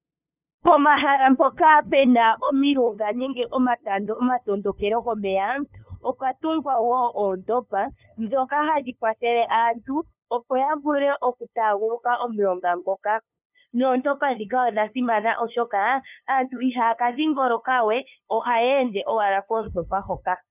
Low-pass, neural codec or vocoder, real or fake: 3.6 kHz; codec, 16 kHz, 2 kbps, FunCodec, trained on LibriTTS, 25 frames a second; fake